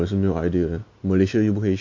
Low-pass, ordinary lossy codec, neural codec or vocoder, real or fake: 7.2 kHz; MP3, 48 kbps; none; real